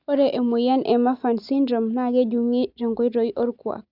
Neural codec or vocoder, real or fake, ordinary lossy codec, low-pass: none; real; Opus, 64 kbps; 5.4 kHz